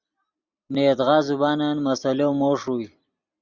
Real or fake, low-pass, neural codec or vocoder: real; 7.2 kHz; none